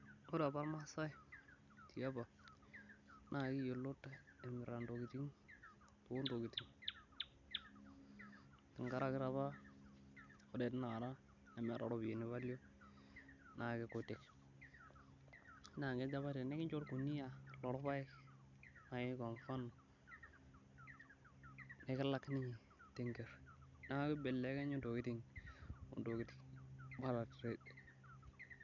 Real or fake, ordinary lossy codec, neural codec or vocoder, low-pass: real; none; none; 7.2 kHz